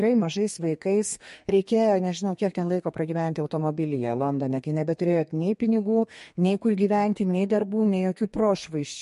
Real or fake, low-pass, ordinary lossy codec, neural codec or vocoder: fake; 14.4 kHz; MP3, 48 kbps; codec, 32 kHz, 1.9 kbps, SNAC